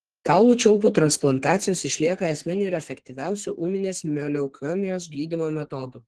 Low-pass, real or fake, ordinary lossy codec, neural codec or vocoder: 10.8 kHz; fake; Opus, 16 kbps; codec, 32 kHz, 1.9 kbps, SNAC